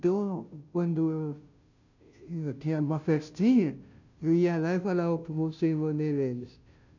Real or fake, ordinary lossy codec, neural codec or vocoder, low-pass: fake; none; codec, 16 kHz, 0.5 kbps, FunCodec, trained on Chinese and English, 25 frames a second; 7.2 kHz